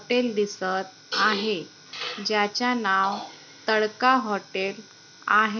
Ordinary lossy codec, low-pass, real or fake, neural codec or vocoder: none; 7.2 kHz; real; none